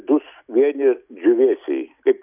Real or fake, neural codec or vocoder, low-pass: real; none; 3.6 kHz